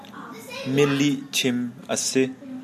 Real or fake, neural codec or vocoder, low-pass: real; none; 14.4 kHz